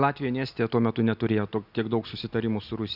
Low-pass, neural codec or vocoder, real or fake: 5.4 kHz; none; real